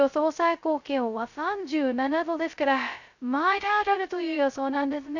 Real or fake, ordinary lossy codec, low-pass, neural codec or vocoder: fake; none; 7.2 kHz; codec, 16 kHz, 0.2 kbps, FocalCodec